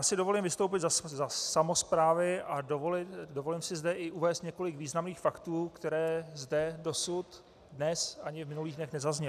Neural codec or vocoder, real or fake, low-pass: none; real; 14.4 kHz